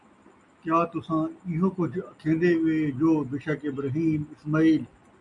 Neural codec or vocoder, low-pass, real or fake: none; 10.8 kHz; real